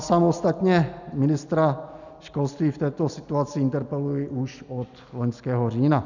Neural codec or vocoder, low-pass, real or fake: none; 7.2 kHz; real